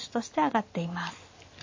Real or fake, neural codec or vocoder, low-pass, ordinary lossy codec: real; none; 7.2 kHz; MP3, 32 kbps